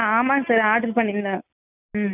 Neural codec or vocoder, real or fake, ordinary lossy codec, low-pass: none; real; none; 3.6 kHz